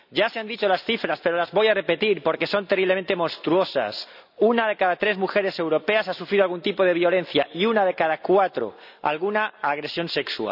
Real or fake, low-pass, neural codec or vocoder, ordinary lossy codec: real; 5.4 kHz; none; none